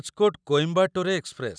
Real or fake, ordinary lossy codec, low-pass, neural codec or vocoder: real; none; 9.9 kHz; none